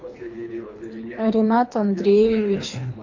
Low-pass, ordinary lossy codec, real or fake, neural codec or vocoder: 7.2 kHz; AAC, 48 kbps; fake; codec, 24 kHz, 3 kbps, HILCodec